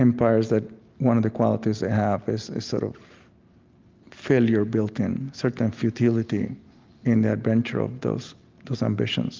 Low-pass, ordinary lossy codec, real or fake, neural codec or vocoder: 7.2 kHz; Opus, 32 kbps; real; none